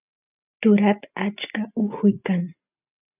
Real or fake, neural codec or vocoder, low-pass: real; none; 3.6 kHz